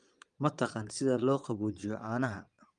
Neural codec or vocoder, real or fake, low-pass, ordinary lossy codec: vocoder, 22.05 kHz, 80 mel bands, Vocos; fake; 9.9 kHz; Opus, 32 kbps